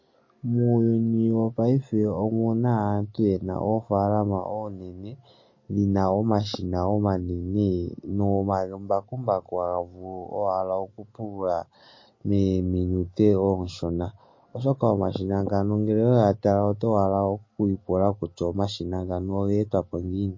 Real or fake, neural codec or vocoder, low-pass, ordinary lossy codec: real; none; 7.2 kHz; MP3, 32 kbps